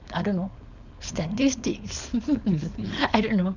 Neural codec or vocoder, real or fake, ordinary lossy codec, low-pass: codec, 16 kHz, 4.8 kbps, FACodec; fake; none; 7.2 kHz